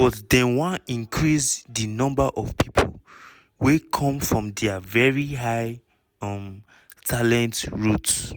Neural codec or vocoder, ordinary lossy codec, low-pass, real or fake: none; none; none; real